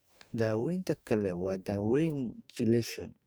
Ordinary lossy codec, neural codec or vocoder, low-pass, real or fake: none; codec, 44.1 kHz, 2.6 kbps, DAC; none; fake